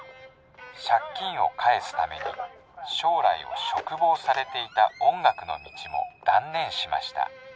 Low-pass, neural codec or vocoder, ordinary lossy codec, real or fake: none; none; none; real